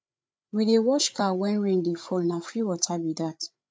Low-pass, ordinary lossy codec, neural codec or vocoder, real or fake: none; none; codec, 16 kHz, 8 kbps, FreqCodec, larger model; fake